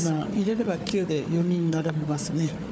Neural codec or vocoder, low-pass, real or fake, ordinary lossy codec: codec, 16 kHz, 4 kbps, FunCodec, trained on Chinese and English, 50 frames a second; none; fake; none